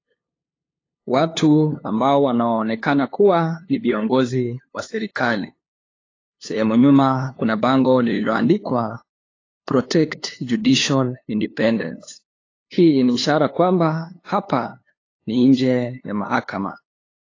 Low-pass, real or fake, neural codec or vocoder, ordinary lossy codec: 7.2 kHz; fake; codec, 16 kHz, 2 kbps, FunCodec, trained on LibriTTS, 25 frames a second; AAC, 32 kbps